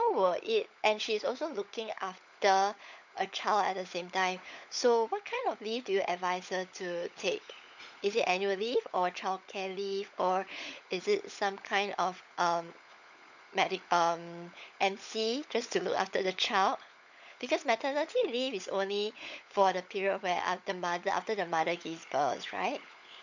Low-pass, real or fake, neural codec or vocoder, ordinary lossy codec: 7.2 kHz; fake; codec, 16 kHz, 16 kbps, FunCodec, trained on LibriTTS, 50 frames a second; none